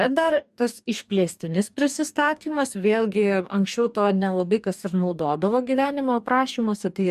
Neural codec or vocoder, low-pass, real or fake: codec, 44.1 kHz, 2.6 kbps, DAC; 14.4 kHz; fake